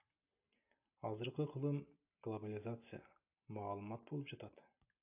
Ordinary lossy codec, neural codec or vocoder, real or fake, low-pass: AAC, 32 kbps; none; real; 3.6 kHz